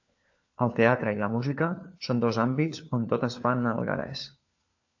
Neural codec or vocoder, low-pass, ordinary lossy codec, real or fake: codec, 16 kHz, 4 kbps, FunCodec, trained on LibriTTS, 50 frames a second; 7.2 kHz; AAC, 48 kbps; fake